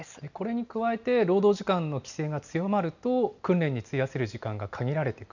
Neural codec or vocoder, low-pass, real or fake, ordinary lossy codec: none; 7.2 kHz; real; none